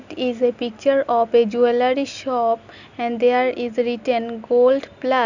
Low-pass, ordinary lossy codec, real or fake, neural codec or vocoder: 7.2 kHz; none; real; none